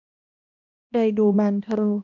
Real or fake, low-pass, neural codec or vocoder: fake; 7.2 kHz; codec, 16 kHz, 1 kbps, X-Codec, HuBERT features, trained on balanced general audio